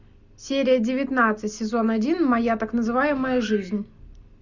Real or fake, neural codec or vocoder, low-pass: real; none; 7.2 kHz